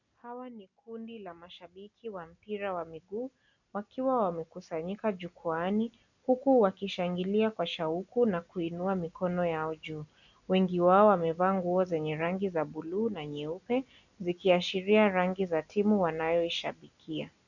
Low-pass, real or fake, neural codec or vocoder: 7.2 kHz; real; none